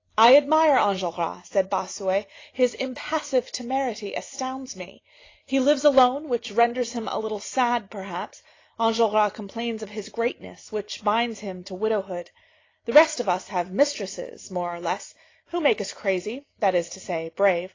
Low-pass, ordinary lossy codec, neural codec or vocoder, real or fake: 7.2 kHz; AAC, 32 kbps; none; real